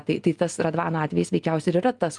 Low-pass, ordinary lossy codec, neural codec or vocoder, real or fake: 10.8 kHz; Opus, 32 kbps; none; real